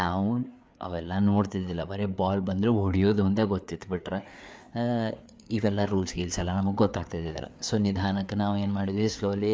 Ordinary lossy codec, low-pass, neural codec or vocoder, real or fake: none; none; codec, 16 kHz, 4 kbps, FreqCodec, larger model; fake